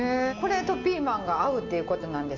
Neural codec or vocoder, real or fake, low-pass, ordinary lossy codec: none; real; 7.2 kHz; MP3, 48 kbps